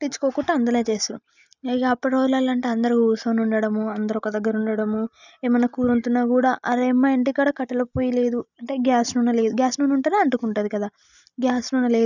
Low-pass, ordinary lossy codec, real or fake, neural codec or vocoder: 7.2 kHz; none; real; none